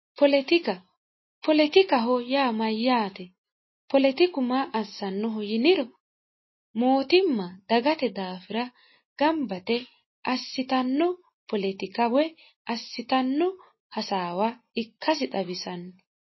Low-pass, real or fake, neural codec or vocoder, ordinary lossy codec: 7.2 kHz; real; none; MP3, 24 kbps